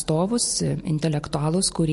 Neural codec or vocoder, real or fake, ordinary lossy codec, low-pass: none; real; MP3, 48 kbps; 14.4 kHz